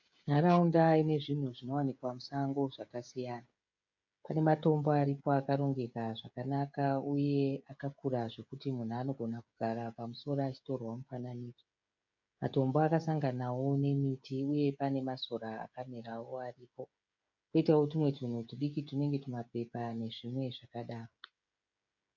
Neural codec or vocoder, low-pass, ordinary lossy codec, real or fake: codec, 16 kHz, 16 kbps, FreqCodec, smaller model; 7.2 kHz; MP3, 64 kbps; fake